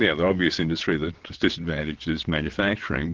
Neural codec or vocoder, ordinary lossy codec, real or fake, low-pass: vocoder, 22.05 kHz, 80 mel bands, WaveNeXt; Opus, 16 kbps; fake; 7.2 kHz